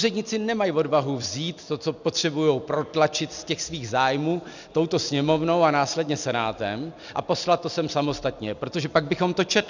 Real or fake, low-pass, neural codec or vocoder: real; 7.2 kHz; none